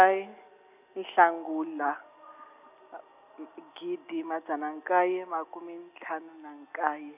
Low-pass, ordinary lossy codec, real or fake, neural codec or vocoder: 3.6 kHz; none; real; none